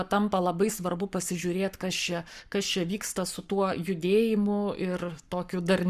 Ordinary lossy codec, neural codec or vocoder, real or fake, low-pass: Opus, 64 kbps; codec, 44.1 kHz, 7.8 kbps, Pupu-Codec; fake; 14.4 kHz